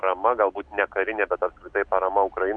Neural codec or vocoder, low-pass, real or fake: none; 9.9 kHz; real